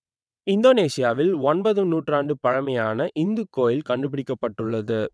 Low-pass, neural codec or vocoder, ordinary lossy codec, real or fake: none; vocoder, 22.05 kHz, 80 mel bands, WaveNeXt; none; fake